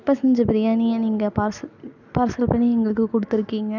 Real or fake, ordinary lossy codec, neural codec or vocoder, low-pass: real; none; none; 7.2 kHz